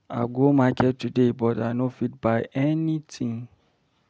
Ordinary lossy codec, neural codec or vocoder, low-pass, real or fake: none; none; none; real